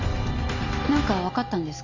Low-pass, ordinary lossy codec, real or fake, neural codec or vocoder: 7.2 kHz; none; real; none